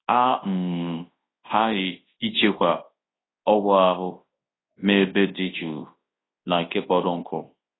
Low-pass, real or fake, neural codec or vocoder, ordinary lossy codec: 7.2 kHz; fake; codec, 24 kHz, 0.9 kbps, WavTokenizer, large speech release; AAC, 16 kbps